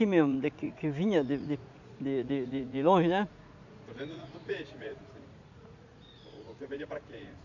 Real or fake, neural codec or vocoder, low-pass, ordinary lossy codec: fake; vocoder, 44.1 kHz, 80 mel bands, Vocos; 7.2 kHz; none